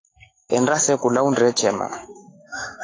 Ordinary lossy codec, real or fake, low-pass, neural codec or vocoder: AAC, 32 kbps; fake; 7.2 kHz; autoencoder, 48 kHz, 128 numbers a frame, DAC-VAE, trained on Japanese speech